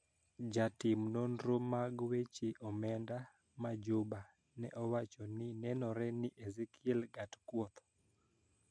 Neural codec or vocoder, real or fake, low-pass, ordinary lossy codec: none; real; 9.9 kHz; MP3, 64 kbps